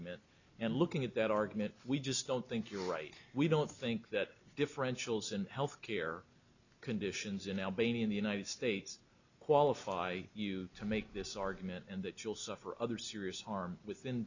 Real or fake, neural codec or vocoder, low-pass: real; none; 7.2 kHz